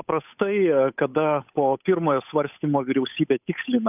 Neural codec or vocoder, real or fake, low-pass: codec, 16 kHz, 8 kbps, FunCodec, trained on Chinese and English, 25 frames a second; fake; 3.6 kHz